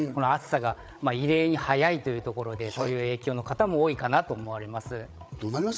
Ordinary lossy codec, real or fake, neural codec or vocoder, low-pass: none; fake; codec, 16 kHz, 8 kbps, FreqCodec, larger model; none